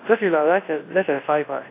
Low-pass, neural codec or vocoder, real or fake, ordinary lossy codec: 3.6 kHz; codec, 24 kHz, 0.9 kbps, WavTokenizer, large speech release; fake; AAC, 24 kbps